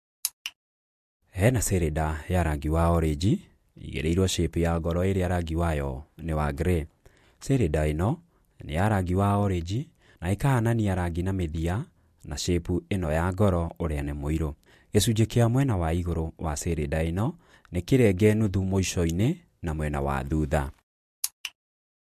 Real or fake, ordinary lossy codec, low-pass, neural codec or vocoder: real; MP3, 64 kbps; 14.4 kHz; none